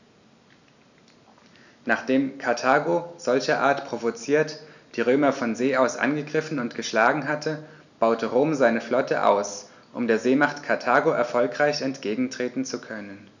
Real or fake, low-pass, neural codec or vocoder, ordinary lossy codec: real; 7.2 kHz; none; none